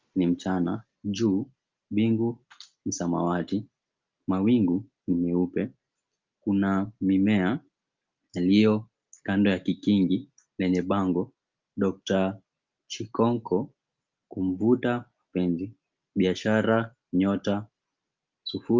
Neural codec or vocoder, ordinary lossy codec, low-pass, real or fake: none; Opus, 24 kbps; 7.2 kHz; real